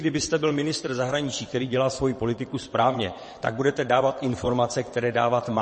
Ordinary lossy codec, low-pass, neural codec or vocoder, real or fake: MP3, 32 kbps; 9.9 kHz; vocoder, 22.05 kHz, 80 mel bands, WaveNeXt; fake